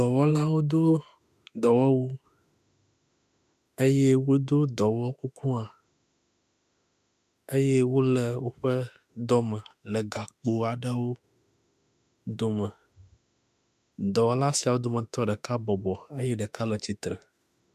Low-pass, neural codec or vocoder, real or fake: 14.4 kHz; autoencoder, 48 kHz, 32 numbers a frame, DAC-VAE, trained on Japanese speech; fake